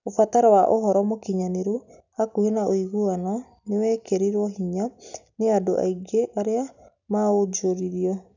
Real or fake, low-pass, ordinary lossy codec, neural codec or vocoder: real; 7.2 kHz; none; none